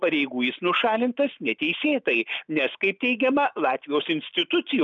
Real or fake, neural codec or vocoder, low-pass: real; none; 7.2 kHz